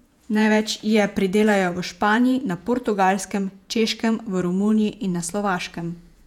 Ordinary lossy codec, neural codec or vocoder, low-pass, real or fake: none; vocoder, 48 kHz, 128 mel bands, Vocos; 19.8 kHz; fake